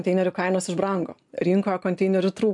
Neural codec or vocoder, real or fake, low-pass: none; real; 10.8 kHz